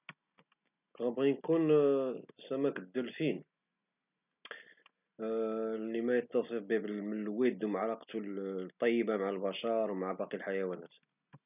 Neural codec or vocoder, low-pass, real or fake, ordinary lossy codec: none; 3.6 kHz; real; none